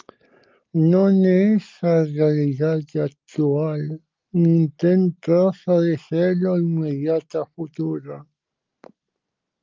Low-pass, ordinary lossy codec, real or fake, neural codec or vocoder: 7.2 kHz; Opus, 24 kbps; real; none